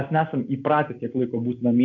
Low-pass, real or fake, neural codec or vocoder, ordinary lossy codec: 7.2 kHz; real; none; MP3, 64 kbps